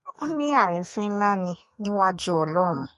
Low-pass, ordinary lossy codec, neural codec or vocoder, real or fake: 14.4 kHz; MP3, 48 kbps; codec, 32 kHz, 1.9 kbps, SNAC; fake